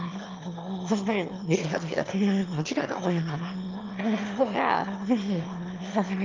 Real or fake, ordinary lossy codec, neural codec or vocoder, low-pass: fake; Opus, 24 kbps; autoencoder, 22.05 kHz, a latent of 192 numbers a frame, VITS, trained on one speaker; 7.2 kHz